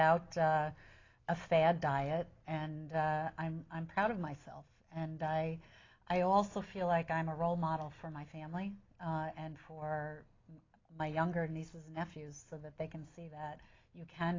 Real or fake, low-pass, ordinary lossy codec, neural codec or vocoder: real; 7.2 kHz; AAC, 32 kbps; none